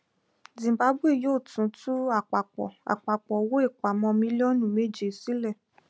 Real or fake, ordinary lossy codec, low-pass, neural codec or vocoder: real; none; none; none